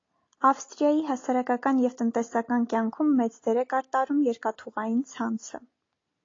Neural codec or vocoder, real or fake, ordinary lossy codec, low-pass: none; real; AAC, 32 kbps; 7.2 kHz